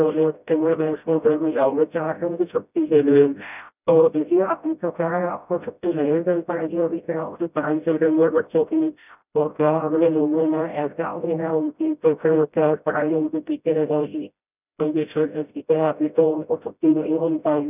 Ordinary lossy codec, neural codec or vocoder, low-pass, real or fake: none; codec, 16 kHz, 0.5 kbps, FreqCodec, smaller model; 3.6 kHz; fake